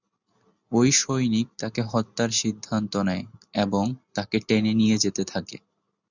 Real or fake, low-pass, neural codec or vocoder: real; 7.2 kHz; none